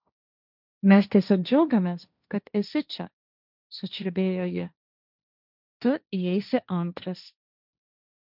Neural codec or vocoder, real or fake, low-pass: codec, 16 kHz, 1.1 kbps, Voila-Tokenizer; fake; 5.4 kHz